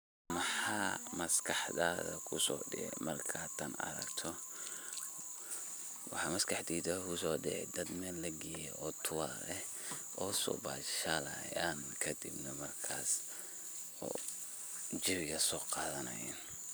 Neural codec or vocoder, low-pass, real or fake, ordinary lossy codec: none; none; real; none